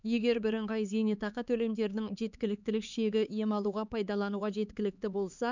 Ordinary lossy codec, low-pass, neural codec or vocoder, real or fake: none; 7.2 kHz; codec, 16 kHz, 4 kbps, X-Codec, HuBERT features, trained on LibriSpeech; fake